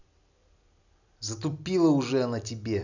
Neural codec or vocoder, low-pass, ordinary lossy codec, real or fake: none; 7.2 kHz; none; real